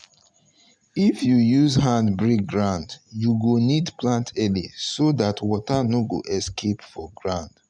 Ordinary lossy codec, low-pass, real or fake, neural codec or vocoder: none; 9.9 kHz; real; none